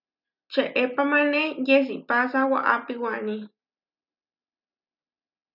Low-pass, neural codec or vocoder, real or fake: 5.4 kHz; none; real